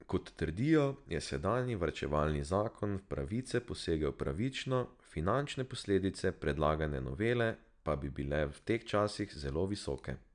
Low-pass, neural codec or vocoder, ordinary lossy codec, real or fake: 9.9 kHz; none; none; real